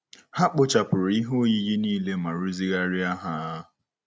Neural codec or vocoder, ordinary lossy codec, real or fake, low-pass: none; none; real; none